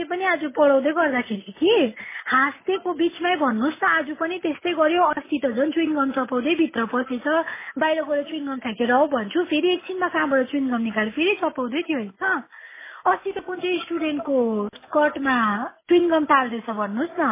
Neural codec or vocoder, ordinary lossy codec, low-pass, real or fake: none; MP3, 16 kbps; 3.6 kHz; real